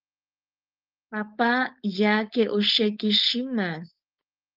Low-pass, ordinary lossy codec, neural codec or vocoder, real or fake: 5.4 kHz; Opus, 32 kbps; codec, 16 kHz, 4.8 kbps, FACodec; fake